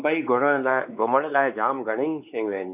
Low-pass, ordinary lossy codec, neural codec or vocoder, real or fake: 3.6 kHz; none; codec, 16 kHz, 4 kbps, X-Codec, WavLM features, trained on Multilingual LibriSpeech; fake